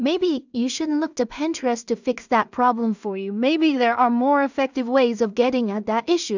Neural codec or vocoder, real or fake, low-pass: codec, 16 kHz in and 24 kHz out, 0.4 kbps, LongCat-Audio-Codec, two codebook decoder; fake; 7.2 kHz